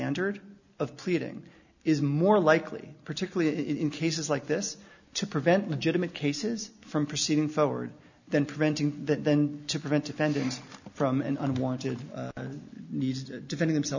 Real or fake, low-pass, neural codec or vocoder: real; 7.2 kHz; none